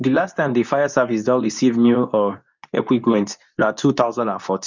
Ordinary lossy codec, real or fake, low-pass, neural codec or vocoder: none; fake; 7.2 kHz; codec, 24 kHz, 0.9 kbps, WavTokenizer, medium speech release version 2